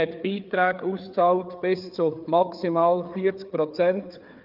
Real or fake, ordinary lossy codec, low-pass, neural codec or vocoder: fake; Opus, 24 kbps; 5.4 kHz; codec, 16 kHz, 4 kbps, FreqCodec, larger model